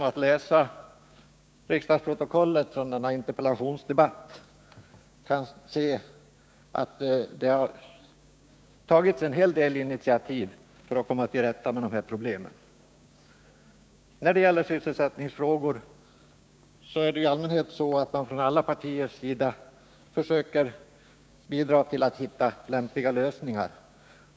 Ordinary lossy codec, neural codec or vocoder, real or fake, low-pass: none; codec, 16 kHz, 6 kbps, DAC; fake; none